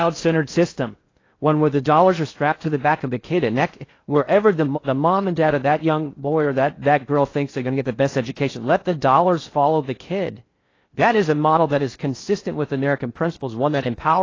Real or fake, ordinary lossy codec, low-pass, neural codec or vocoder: fake; AAC, 32 kbps; 7.2 kHz; codec, 16 kHz in and 24 kHz out, 0.6 kbps, FocalCodec, streaming, 4096 codes